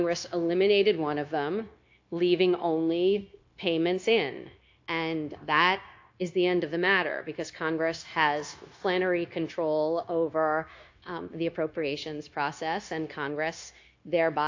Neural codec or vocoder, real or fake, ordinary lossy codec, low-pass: codec, 16 kHz, 0.9 kbps, LongCat-Audio-Codec; fake; AAC, 48 kbps; 7.2 kHz